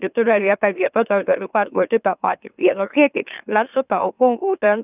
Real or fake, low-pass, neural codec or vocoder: fake; 3.6 kHz; autoencoder, 44.1 kHz, a latent of 192 numbers a frame, MeloTTS